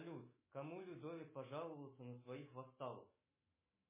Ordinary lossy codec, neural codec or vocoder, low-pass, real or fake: MP3, 16 kbps; autoencoder, 48 kHz, 128 numbers a frame, DAC-VAE, trained on Japanese speech; 3.6 kHz; fake